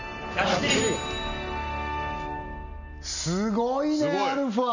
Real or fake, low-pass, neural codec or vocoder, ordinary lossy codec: real; 7.2 kHz; none; none